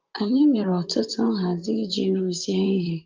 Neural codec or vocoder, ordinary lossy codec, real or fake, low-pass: vocoder, 44.1 kHz, 128 mel bands, Pupu-Vocoder; Opus, 32 kbps; fake; 7.2 kHz